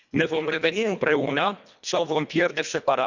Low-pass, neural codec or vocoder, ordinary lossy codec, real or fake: 7.2 kHz; codec, 24 kHz, 1.5 kbps, HILCodec; none; fake